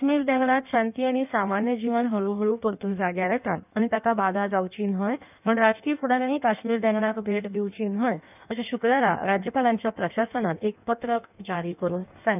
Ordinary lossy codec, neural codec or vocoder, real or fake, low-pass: none; codec, 16 kHz in and 24 kHz out, 1.1 kbps, FireRedTTS-2 codec; fake; 3.6 kHz